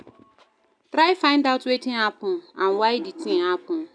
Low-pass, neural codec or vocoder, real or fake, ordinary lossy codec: 9.9 kHz; none; real; none